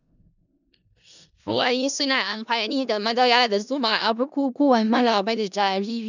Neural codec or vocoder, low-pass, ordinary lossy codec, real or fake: codec, 16 kHz in and 24 kHz out, 0.4 kbps, LongCat-Audio-Codec, four codebook decoder; 7.2 kHz; none; fake